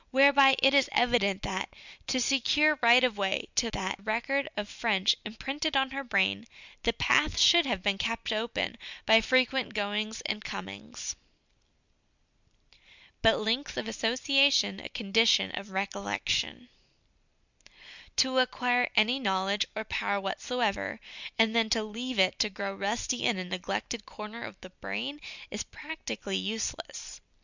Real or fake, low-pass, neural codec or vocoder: real; 7.2 kHz; none